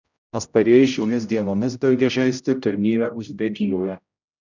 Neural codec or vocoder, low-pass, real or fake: codec, 16 kHz, 0.5 kbps, X-Codec, HuBERT features, trained on general audio; 7.2 kHz; fake